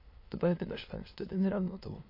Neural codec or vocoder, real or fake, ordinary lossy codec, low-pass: autoencoder, 22.05 kHz, a latent of 192 numbers a frame, VITS, trained on many speakers; fake; MP3, 32 kbps; 5.4 kHz